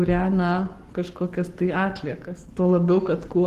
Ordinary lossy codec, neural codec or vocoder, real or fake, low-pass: Opus, 16 kbps; codec, 44.1 kHz, 7.8 kbps, Pupu-Codec; fake; 14.4 kHz